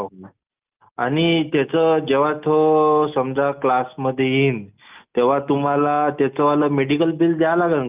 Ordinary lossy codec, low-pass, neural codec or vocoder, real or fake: Opus, 24 kbps; 3.6 kHz; none; real